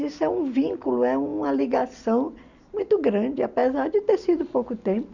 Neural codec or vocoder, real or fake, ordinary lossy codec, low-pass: none; real; none; 7.2 kHz